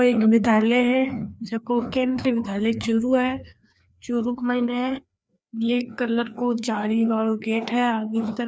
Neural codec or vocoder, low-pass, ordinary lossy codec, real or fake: codec, 16 kHz, 2 kbps, FreqCodec, larger model; none; none; fake